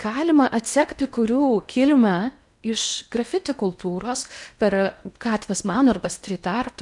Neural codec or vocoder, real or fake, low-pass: codec, 16 kHz in and 24 kHz out, 0.8 kbps, FocalCodec, streaming, 65536 codes; fake; 10.8 kHz